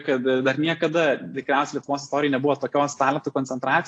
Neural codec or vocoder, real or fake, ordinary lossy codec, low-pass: none; real; AAC, 48 kbps; 9.9 kHz